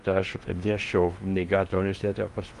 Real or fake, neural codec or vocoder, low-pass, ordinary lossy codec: fake; codec, 16 kHz in and 24 kHz out, 0.8 kbps, FocalCodec, streaming, 65536 codes; 10.8 kHz; Opus, 24 kbps